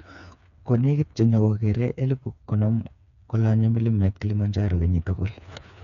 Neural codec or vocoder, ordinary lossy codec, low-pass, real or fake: codec, 16 kHz, 4 kbps, FreqCodec, smaller model; none; 7.2 kHz; fake